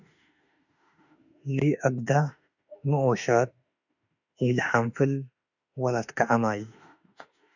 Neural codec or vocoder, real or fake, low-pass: autoencoder, 48 kHz, 32 numbers a frame, DAC-VAE, trained on Japanese speech; fake; 7.2 kHz